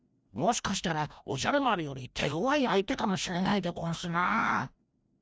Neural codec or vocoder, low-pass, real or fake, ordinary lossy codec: codec, 16 kHz, 1 kbps, FreqCodec, larger model; none; fake; none